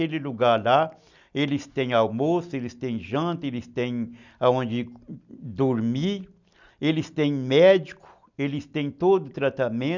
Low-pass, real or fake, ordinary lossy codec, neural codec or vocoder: 7.2 kHz; real; none; none